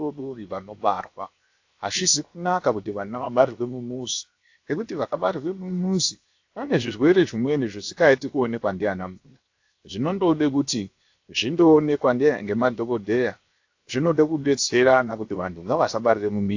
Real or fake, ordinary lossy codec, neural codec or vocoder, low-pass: fake; AAC, 48 kbps; codec, 16 kHz, 0.7 kbps, FocalCodec; 7.2 kHz